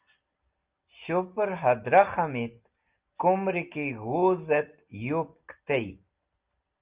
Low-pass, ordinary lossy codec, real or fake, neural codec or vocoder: 3.6 kHz; Opus, 32 kbps; real; none